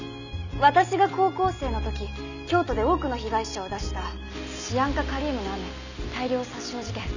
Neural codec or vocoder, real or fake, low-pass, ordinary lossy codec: none; real; 7.2 kHz; none